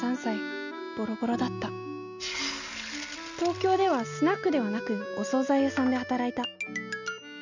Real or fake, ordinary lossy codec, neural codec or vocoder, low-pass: real; none; none; 7.2 kHz